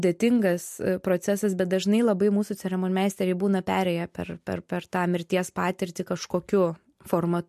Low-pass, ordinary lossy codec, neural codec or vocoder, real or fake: 14.4 kHz; MP3, 64 kbps; none; real